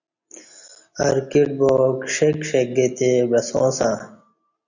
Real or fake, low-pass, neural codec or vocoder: real; 7.2 kHz; none